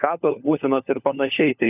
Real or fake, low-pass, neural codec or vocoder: fake; 3.6 kHz; codec, 16 kHz, 4 kbps, FunCodec, trained on LibriTTS, 50 frames a second